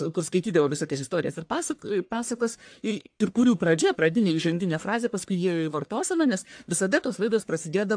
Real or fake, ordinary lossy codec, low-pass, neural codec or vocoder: fake; AAC, 64 kbps; 9.9 kHz; codec, 44.1 kHz, 1.7 kbps, Pupu-Codec